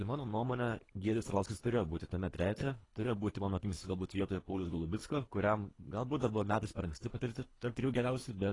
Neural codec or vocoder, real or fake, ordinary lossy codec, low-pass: codec, 24 kHz, 3 kbps, HILCodec; fake; AAC, 32 kbps; 10.8 kHz